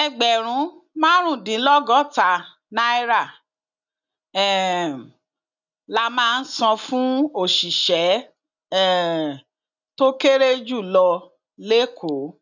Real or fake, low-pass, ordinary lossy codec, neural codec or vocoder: real; 7.2 kHz; none; none